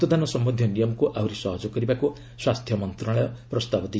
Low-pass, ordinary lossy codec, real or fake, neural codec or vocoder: none; none; real; none